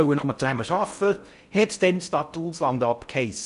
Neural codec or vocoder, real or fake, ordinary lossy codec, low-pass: codec, 16 kHz in and 24 kHz out, 0.6 kbps, FocalCodec, streaming, 4096 codes; fake; none; 10.8 kHz